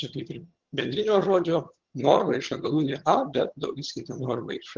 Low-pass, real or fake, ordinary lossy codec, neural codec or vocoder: 7.2 kHz; fake; Opus, 16 kbps; vocoder, 22.05 kHz, 80 mel bands, HiFi-GAN